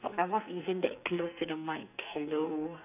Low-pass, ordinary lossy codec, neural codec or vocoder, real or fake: 3.6 kHz; none; codec, 44.1 kHz, 2.6 kbps, SNAC; fake